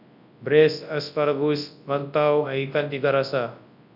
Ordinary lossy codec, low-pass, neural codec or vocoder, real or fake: none; 5.4 kHz; codec, 24 kHz, 0.9 kbps, WavTokenizer, large speech release; fake